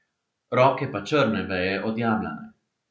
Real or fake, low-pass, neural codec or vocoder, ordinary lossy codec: real; none; none; none